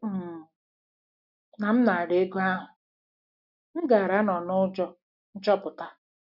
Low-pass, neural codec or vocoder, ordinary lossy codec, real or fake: 5.4 kHz; none; none; real